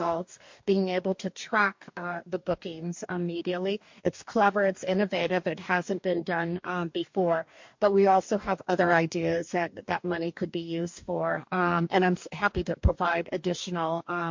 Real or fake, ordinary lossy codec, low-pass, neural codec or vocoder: fake; MP3, 48 kbps; 7.2 kHz; codec, 44.1 kHz, 2.6 kbps, DAC